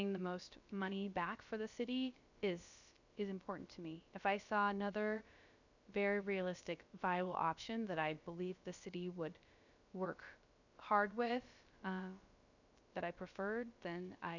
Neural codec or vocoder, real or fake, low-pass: codec, 16 kHz, about 1 kbps, DyCAST, with the encoder's durations; fake; 7.2 kHz